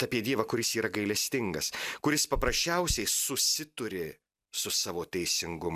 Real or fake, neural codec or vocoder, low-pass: real; none; 14.4 kHz